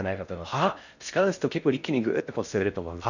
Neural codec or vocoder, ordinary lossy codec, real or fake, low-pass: codec, 16 kHz in and 24 kHz out, 0.6 kbps, FocalCodec, streaming, 4096 codes; none; fake; 7.2 kHz